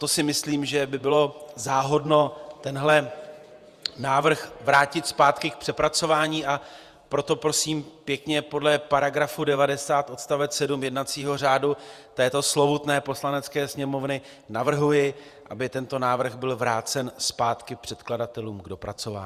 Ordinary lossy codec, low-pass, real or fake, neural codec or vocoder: Opus, 64 kbps; 14.4 kHz; fake; vocoder, 48 kHz, 128 mel bands, Vocos